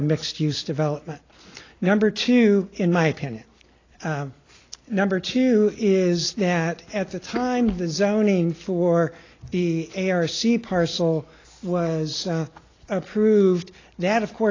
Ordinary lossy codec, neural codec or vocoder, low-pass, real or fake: AAC, 32 kbps; none; 7.2 kHz; real